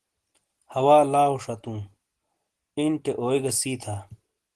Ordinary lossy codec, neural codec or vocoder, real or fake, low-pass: Opus, 16 kbps; none; real; 10.8 kHz